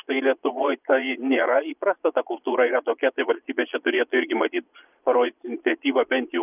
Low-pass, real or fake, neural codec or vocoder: 3.6 kHz; fake; vocoder, 22.05 kHz, 80 mel bands, Vocos